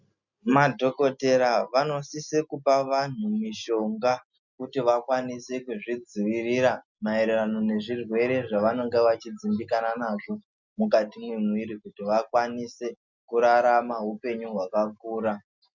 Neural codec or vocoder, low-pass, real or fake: none; 7.2 kHz; real